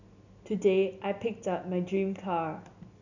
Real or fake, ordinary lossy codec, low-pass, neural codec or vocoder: real; none; 7.2 kHz; none